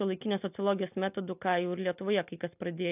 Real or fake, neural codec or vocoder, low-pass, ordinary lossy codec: real; none; 3.6 kHz; AAC, 32 kbps